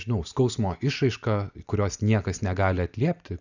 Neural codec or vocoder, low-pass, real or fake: none; 7.2 kHz; real